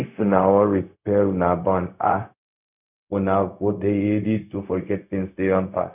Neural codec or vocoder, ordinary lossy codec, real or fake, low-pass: codec, 16 kHz, 0.4 kbps, LongCat-Audio-Codec; none; fake; 3.6 kHz